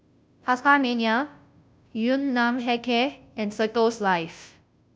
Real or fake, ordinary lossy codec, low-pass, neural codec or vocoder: fake; none; none; codec, 16 kHz, 0.5 kbps, FunCodec, trained on Chinese and English, 25 frames a second